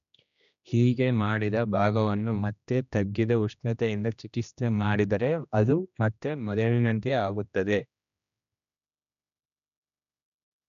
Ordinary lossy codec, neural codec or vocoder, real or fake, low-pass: none; codec, 16 kHz, 1 kbps, X-Codec, HuBERT features, trained on general audio; fake; 7.2 kHz